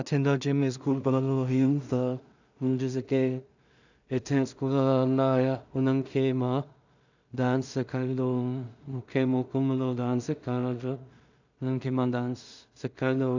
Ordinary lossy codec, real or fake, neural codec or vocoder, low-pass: none; fake; codec, 16 kHz in and 24 kHz out, 0.4 kbps, LongCat-Audio-Codec, two codebook decoder; 7.2 kHz